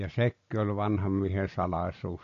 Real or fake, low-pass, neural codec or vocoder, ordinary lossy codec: real; 7.2 kHz; none; MP3, 48 kbps